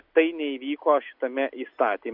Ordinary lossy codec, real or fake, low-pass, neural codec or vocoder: MP3, 48 kbps; real; 5.4 kHz; none